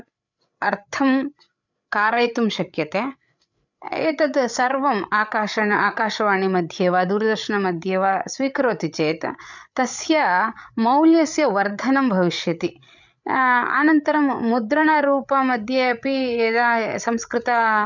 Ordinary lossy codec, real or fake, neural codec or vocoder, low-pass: none; fake; codec, 16 kHz, 8 kbps, FreqCodec, larger model; 7.2 kHz